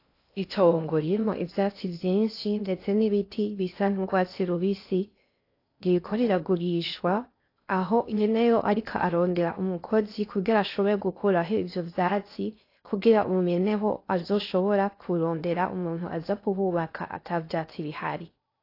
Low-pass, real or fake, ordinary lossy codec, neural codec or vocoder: 5.4 kHz; fake; AAC, 32 kbps; codec, 16 kHz in and 24 kHz out, 0.6 kbps, FocalCodec, streaming, 2048 codes